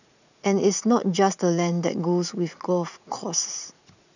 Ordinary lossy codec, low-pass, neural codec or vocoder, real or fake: none; 7.2 kHz; vocoder, 44.1 kHz, 80 mel bands, Vocos; fake